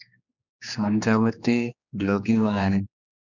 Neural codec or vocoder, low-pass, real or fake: codec, 16 kHz, 2 kbps, X-Codec, HuBERT features, trained on general audio; 7.2 kHz; fake